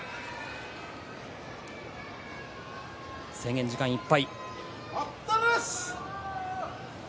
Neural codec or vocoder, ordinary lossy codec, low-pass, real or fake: none; none; none; real